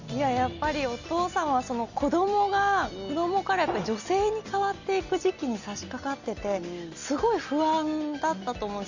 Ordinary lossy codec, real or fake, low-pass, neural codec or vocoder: Opus, 64 kbps; real; 7.2 kHz; none